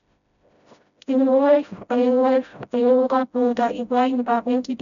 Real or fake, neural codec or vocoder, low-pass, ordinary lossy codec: fake; codec, 16 kHz, 0.5 kbps, FreqCodec, smaller model; 7.2 kHz; none